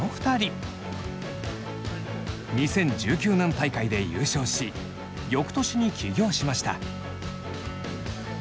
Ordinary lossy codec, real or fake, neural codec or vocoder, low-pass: none; real; none; none